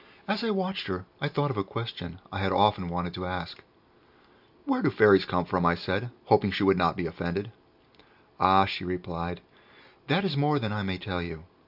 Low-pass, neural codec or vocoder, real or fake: 5.4 kHz; none; real